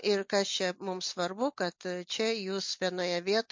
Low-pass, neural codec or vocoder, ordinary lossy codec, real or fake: 7.2 kHz; none; MP3, 48 kbps; real